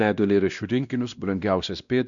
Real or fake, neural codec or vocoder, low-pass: fake; codec, 16 kHz, 1 kbps, X-Codec, WavLM features, trained on Multilingual LibriSpeech; 7.2 kHz